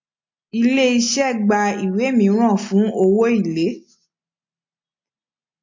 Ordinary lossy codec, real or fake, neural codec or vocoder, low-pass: MP3, 48 kbps; real; none; 7.2 kHz